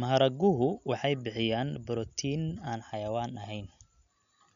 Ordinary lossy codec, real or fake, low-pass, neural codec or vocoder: none; real; 7.2 kHz; none